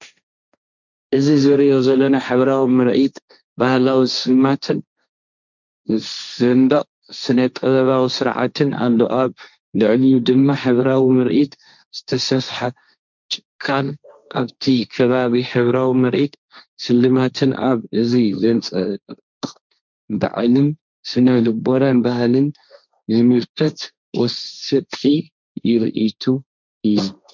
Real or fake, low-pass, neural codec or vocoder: fake; 7.2 kHz; codec, 16 kHz, 1.1 kbps, Voila-Tokenizer